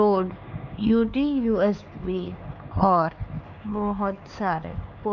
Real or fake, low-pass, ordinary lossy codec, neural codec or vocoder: fake; 7.2 kHz; none; codec, 16 kHz, 4 kbps, X-Codec, HuBERT features, trained on LibriSpeech